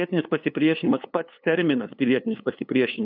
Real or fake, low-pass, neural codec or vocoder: fake; 5.4 kHz; codec, 16 kHz, 2 kbps, FunCodec, trained on LibriTTS, 25 frames a second